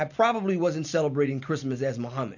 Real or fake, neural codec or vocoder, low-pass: real; none; 7.2 kHz